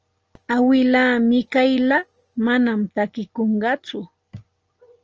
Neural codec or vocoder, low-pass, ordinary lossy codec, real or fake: none; 7.2 kHz; Opus, 24 kbps; real